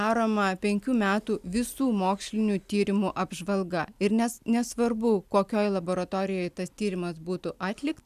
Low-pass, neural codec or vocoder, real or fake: 14.4 kHz; none; real